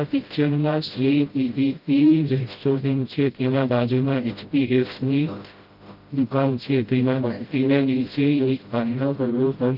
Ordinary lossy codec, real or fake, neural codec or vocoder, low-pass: Opus, 32 kbps; fake; codec, 16 kHz, 0.5 kbps, FreqCodec, smaller model; 5.4 kHz